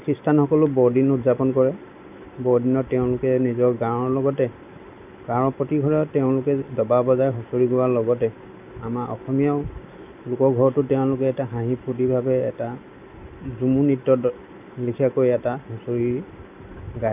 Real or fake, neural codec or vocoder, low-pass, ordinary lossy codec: real; none; 3.6 kHz; none